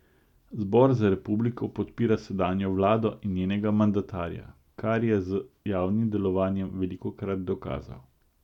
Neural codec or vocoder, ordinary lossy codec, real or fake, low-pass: none; none; real; 19.8 kHz